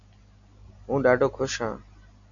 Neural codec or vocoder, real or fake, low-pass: none; real; 7.2 kHz